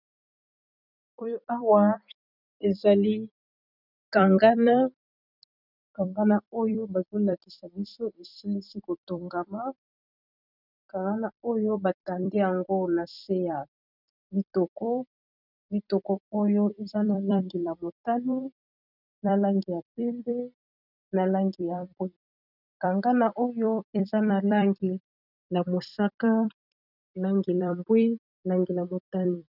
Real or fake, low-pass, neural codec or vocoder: fake; 5.4 kHz; vocoder, 44.1 kHz, 128 mel bands every 256 samples, BigVGAN v2